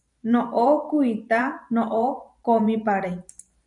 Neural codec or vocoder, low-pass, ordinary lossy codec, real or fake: vocoder, 44.1 kHz, 128 mel bands every 512 samples, BigVGAN v2; 10.8 kHz; MP3, 96 kbps; fake